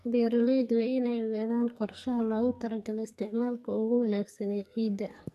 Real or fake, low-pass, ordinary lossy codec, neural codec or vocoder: fake; 14.4 kHz; AAC, 96 kbps; codec, 44.1 kHz, 2.6 kbps, SNAC